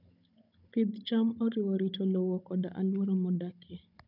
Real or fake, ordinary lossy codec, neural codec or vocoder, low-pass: fake; none; codec, 16 kHz, 16 kbps, FunCodec, trained on Chinese and English, 50 frames a second; 5.4 kHz